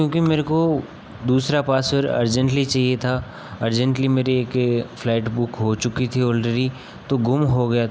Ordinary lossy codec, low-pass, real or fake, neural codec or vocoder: none; none; real; none